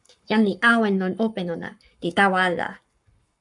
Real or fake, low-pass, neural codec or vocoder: fake; 10.8 kHz; codec, 44.1 kHz, 7.8 kbps, Pupu-Codec